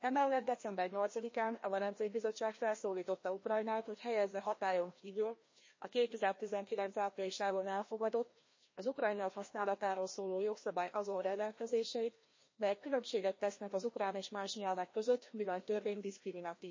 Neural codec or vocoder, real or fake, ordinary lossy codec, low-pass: codec, 16 kHz, 1 kbps, FreqCodec, larger model; fake; MP3, 32 kbps; 7.2 kHz